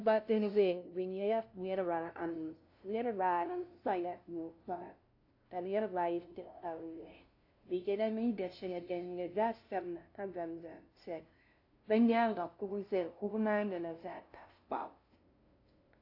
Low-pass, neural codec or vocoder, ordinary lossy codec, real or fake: 5.4 kHz; codec, 16 kHz, 0.5 kbps, FunCodec, trained on LibriTTS, 25 frames a second; AAC, 32 kbps; fake